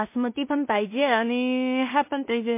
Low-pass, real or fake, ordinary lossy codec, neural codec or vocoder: 3.6 kHz; fake; MP3, 24 kbps; codec, 16 kHz in and 24 kHz out, 0.4 kbps, LongCat-Audio-Codec, two codebook decoder